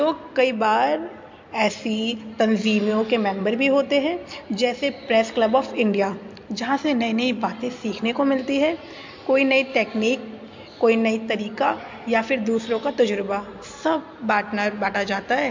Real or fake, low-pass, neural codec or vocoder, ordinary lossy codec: real; 7.2 kHz; none; MP3, 48 kbps